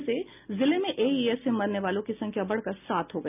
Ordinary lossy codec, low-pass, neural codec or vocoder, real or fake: none; 3.6 kHz; none; real